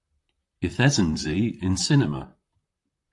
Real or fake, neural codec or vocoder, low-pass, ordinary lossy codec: fake; vocoder, 44.1 kHz, 128 mel bands, Pupu-Vocoder; 10.8 kHz; AAC, 64 kbps